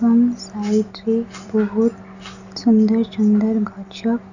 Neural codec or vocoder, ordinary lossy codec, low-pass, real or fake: none; none; 7.2 kHz; real